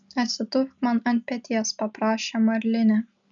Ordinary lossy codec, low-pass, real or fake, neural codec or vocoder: MP3, 96 kbps; 7.2 kHz; real; none